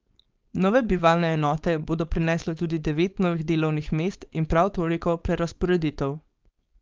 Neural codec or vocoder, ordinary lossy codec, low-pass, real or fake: codec, 16 kHz, 4.8 kbps, FACodec; Opus, 24 kbps; 7.2 kHz; fake